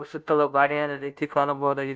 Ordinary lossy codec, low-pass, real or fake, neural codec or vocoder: none; none; fake; codec, 16 kHz, 0.5 kbps, FunCodec, trained on Chinese and English, 25 frames a second